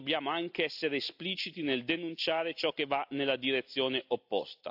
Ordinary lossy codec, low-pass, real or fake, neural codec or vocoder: AAC, 48 kbps; 5.4 kHz; real; none